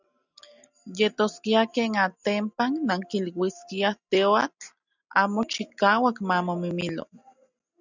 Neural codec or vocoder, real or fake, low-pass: none; real; 7.2 kHz